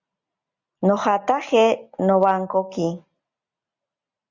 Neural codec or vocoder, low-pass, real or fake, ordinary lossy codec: none; 7.2 kHz; real; Opus, 64 kbps